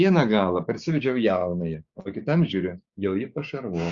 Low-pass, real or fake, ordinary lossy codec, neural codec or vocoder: 7.2 kHz; fake; Opus, 64 kbps; codec, 16 kHz, 6 kbps, DAC